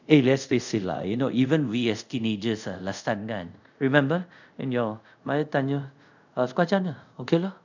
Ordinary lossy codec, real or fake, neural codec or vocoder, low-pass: none; fake; codec, 24 kHz, 0.5 kbps, DualCodec; 7.2 kHz